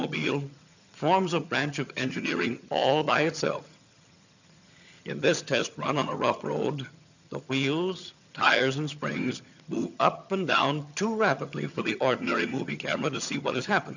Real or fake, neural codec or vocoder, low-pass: fake; vocoder, 22.05 kHz, 80 mel bands, HiFi-GAN; 7.2 kHz